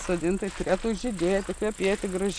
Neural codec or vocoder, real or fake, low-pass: none; real; 9.9 kHz